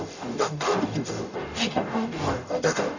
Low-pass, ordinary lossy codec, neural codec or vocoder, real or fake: 7.2 kHz; none; codec, 44.1 kHz, 0.9 kbps, DAC; fake